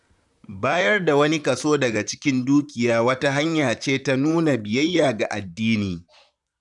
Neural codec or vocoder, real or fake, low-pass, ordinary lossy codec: vocoder, 44.1 kHz, 128 mel bands, Pupu-Vocoder; fake; 10.8 kHz; none